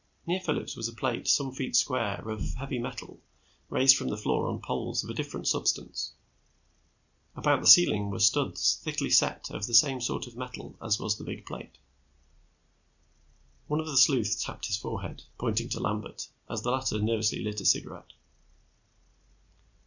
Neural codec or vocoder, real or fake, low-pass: none; real; 7.2 kHz